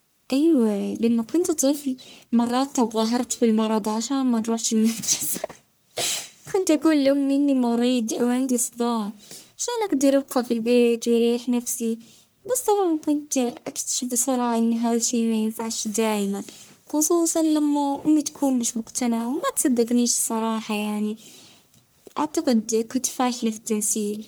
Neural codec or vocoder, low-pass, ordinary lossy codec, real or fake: codec, 44.1 kHz, 1.7 kbps, Pupu-Codec; none; none; fake